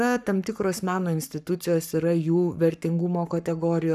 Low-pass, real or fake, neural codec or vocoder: 14.4 kHz; fake; codec, 44.1 kHz, 7.8 kbps, Pupu-Codec